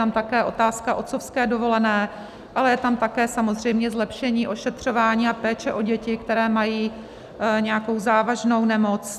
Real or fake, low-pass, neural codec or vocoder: real; 14.4 kHz; none